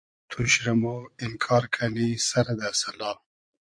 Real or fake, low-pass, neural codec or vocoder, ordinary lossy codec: fake; 9.9 kHz; vocoder, 22.05 kHz, 80 mel bands, Vocos; MP3, 64 kbps